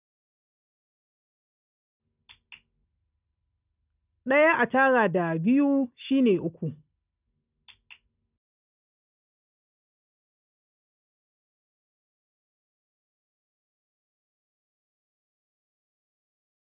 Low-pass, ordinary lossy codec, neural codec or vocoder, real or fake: 3.6 kHz; none; none; real